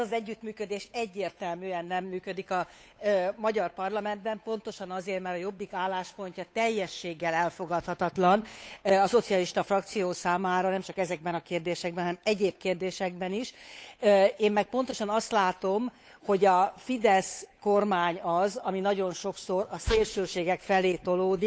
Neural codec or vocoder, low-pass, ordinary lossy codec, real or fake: codec, 16 kHz, 8 kbps, FunCodec, trained on Chinese and English, 25 frames a second; none; none; fake